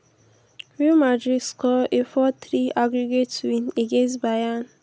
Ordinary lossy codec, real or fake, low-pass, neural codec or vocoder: none; real; none; none